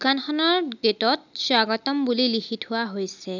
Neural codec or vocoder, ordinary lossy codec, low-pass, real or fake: none; none; 7.2 kHz; real